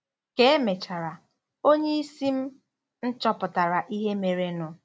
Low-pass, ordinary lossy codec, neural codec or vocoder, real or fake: none; none; none; real